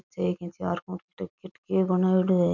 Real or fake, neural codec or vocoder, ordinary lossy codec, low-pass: real; none; none; 7.2 kHz